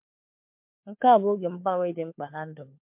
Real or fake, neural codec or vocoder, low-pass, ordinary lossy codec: fake; codec, 16 kHz, 4 kbps, FunCodec, trained on LibriTTS, 50 frames a second; 3.6 kHz; MP3, 32 kbps